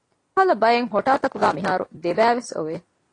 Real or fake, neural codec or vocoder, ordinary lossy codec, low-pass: real; none; AAC, 32 kbps; 9.9 kHz